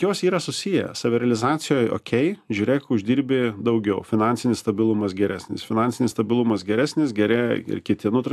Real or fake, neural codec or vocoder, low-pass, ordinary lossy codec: real; none; 14.4 kHz; AAC, 96 kbps